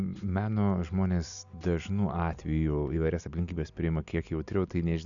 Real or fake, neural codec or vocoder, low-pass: real; none; 7.2 kHz